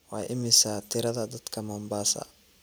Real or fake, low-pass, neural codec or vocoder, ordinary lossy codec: real; none; none; none